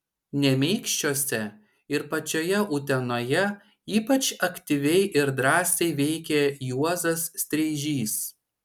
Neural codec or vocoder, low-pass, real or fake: none; 19.8 kHz; real